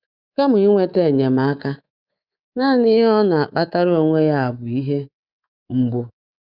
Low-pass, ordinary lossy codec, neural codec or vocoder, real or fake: 5.4 kHz; Opus, 64 kbps; codec, 24 kHz, 3.1 kbps, DualCodec; fake